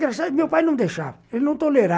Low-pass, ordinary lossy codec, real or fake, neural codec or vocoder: none; none; real; none